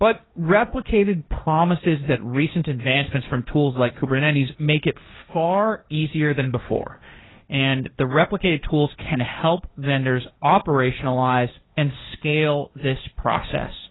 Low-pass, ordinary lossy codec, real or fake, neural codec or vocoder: 7.2 kHz; AAC, 16 kbps; fake; codec, 16 kHz, 1.1 kbps, Voila-Tokenizer